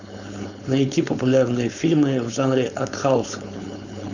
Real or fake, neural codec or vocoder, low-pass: fake; codec, 16 kHz, 4.8 kbps, FACodec; 7.2 kHz